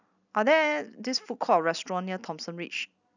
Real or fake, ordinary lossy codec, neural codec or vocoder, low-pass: real; none; none; 7.2 kHz